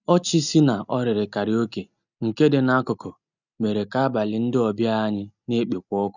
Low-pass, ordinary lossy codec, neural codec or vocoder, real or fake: 7.2 kHz; none; none; real